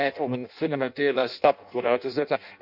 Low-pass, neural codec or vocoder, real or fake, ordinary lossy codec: 5.4 kHz; codec, 16 kHz in and 24 kHz out, 0.6 kbps, FireRedTTS-2 codec; fake; none